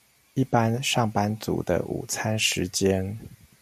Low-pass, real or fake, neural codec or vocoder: 14.4 kHz; real; none